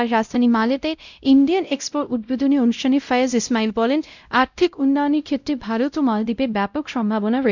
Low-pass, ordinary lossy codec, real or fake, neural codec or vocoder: 7.2 kHz; none; fake; codec, 16 kHz, 0.5 kbps, X-Codec, WavLM features, trained on Multilingual LibriSpeech